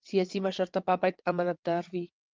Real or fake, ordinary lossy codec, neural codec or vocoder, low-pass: fake; Opus, 24 kbps; vocoder, 22.05 kHz, 80 mel bands, WaveNeXt; 7.2 kHz